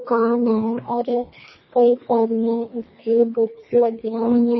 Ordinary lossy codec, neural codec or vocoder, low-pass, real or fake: MP3, 24 kbps; codec, 24 kHz, 1.5 kbps, HILCodec; 7.2 kHz; fake